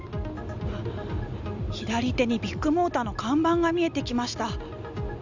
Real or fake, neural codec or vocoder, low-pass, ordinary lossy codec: real; none; 7.2 kHz; none